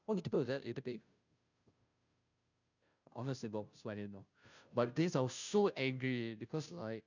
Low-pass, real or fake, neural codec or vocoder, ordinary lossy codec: 7.2 kHz; fake; codec, 16 kHz, 0.5 kbps, FunCodec, trained on Chinese and English, 25 frames a second; none